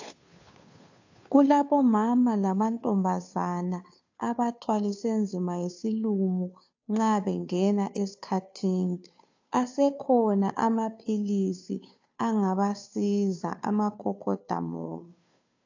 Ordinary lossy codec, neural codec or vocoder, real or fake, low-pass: AAC, 48 kbps; codec, 16 kHz, 2 kbps, FunCodec, trained on Chinese and English, 25 frames a second; fake; 7.2 kHz